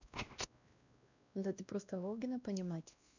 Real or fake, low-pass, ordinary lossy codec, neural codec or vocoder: fake; 7.2 kHz; none; codec, 16 kHz, 1 kbps, X-Codec, WavLM features, trained on Multilingual LibriSpeech